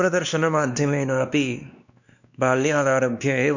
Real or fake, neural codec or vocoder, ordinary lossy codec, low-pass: fake; codec, 16 kHz, 2 kbps, X-Codec, WavLM features, trained on Multilingual LibriSpeech; none; 7.2 kHz